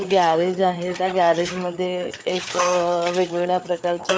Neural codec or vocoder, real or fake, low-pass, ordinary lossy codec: codec, 16 kHz, 4 kbps, FreqCodec, larger model; fake; none; none